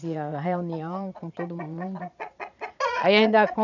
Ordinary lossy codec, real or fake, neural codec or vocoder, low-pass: none; fake; vocoder, 22.05 kHz, 80 mel bands, Vocos; 7.2 kHz